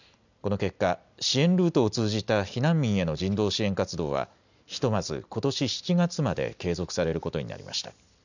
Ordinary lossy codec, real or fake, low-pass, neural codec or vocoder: none; real; 7.2 kHz; none